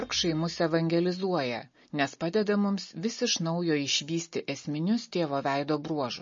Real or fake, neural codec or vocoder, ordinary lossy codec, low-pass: real; none; MP3, 32 kbps; 7.2 kHz